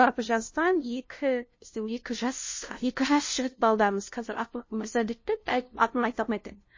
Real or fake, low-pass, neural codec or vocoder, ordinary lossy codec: fake; 7.2 kHz; codec, 16 kHz, 0.5 kbps, FunCodec, trained on LibriTTS, 25 frames a second; MP3, 32 kbps